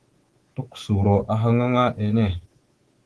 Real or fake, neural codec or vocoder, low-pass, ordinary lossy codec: fake; codec, 24 kHz, 3.1 kbps, DualCodec; 10.8 kHz; Opus, 16 kbps